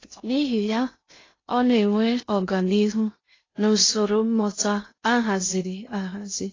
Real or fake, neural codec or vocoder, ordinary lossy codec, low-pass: fake; codec, 16 kHz in and 24 kHz out, 0.6 kbps, FocalCodec, streaming, 2048 codes; AAC, 32 kbps; 7.2 kHz